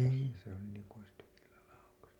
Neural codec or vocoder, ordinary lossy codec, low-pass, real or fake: none; none; 19.8 kHz; real